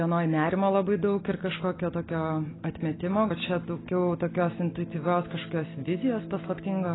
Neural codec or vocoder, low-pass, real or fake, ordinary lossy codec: none; 7.2 kHz; real; AAC, 16 kbps